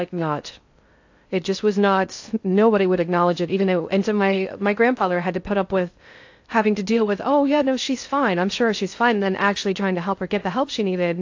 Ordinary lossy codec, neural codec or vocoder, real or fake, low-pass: AAC, 48 kbps; codec, 16 kHz in and 24 kHz out, 0.6 kbps, FocalCodec, streaming, 2048 codes; fake; 7.2 kHz